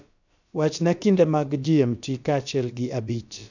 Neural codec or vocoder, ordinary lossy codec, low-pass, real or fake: codec, 16 kHz, about 1 kbps, DyCAST, with the encoder's durations; none; 7.2 kHz; fake